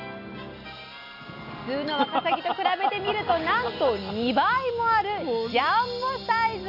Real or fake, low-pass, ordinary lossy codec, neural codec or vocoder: real; 5.4 kHz; none; none